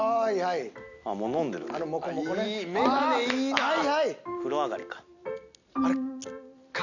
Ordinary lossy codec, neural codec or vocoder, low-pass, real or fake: none; none; 7.2 kHz; real